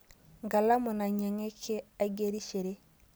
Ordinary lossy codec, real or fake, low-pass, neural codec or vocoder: none; real; none; none